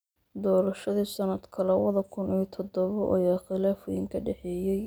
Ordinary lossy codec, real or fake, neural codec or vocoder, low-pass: none; real; none; none